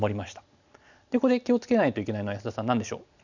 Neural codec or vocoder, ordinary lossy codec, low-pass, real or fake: none; none; 7.2 kHz; real